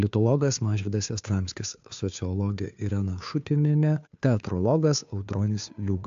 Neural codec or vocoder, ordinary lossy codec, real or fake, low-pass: codec, 16 kHz, 2 kbps, FunCodec, trained on Chinese and English, 25 frames a second; AAC, 64 kbps; fake; 7.2 kHz